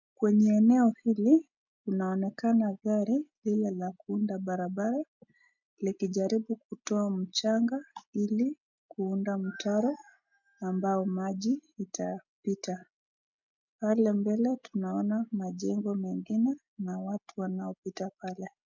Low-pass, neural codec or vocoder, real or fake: 7.2 kHz; none; real